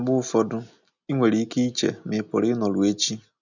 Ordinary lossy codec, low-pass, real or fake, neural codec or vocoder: none; 7.2 kHz; real; none